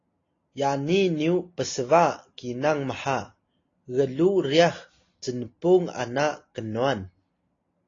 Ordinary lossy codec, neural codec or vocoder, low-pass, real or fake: AAC, 32 kbps; none; 7.2 kHz; real